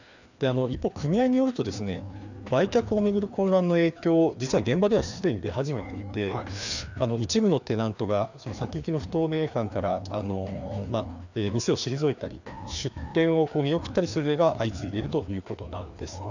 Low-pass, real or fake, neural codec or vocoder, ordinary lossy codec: 7.2 kHz; fake; codec, 16 kHz, 2 kbps, FreqCodec, larger model; none